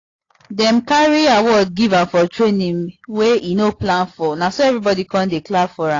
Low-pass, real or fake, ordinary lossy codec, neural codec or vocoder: 7.2 kHz; real; AAC, 32 kbps; none